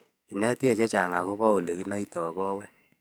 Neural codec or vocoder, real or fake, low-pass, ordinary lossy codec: codec, 44.1 kHz, 2.6 kbps, SNAC; fake; none; none